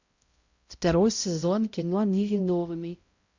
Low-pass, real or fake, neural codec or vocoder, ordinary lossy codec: 7.2 kHz; fake; codec, 16 kHz, 0.5 kbps, X-Codec, HuBERT features, trained on balanced general audio; Opus, 64 kbps